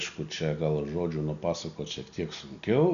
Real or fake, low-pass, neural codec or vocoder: real; 7.2 kHz; none